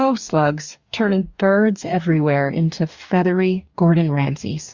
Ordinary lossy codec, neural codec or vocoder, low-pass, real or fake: Opus, 64 kbps; codec, 44.1 kHz, 2.6 kbps, DAC; 7.2 kHz; fake